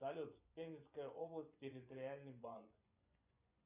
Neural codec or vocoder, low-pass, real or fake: vocoder, 24 kHz, 100 mel bands, Vocos; 3.6 kHz; fake